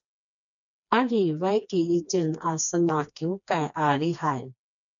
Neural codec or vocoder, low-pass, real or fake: codec, 16 kHz, 2 kbps, FreqCodec, smaller model; 7.2 kHz; fake